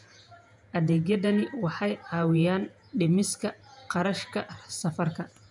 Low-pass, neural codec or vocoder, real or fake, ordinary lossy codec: 10.8 kHz; vocoder, 48 kHz, 128 mel bands, Vocos; fake; none